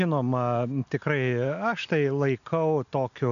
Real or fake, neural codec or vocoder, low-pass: real; none; 7.2 kHz